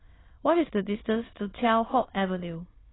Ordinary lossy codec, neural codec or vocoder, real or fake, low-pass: AAC, 16 kbps; autoencoder, 22.05 kHz, a latent of 192 numbers a frame, VITS, trained on many speakers; fake; 7.2 kHz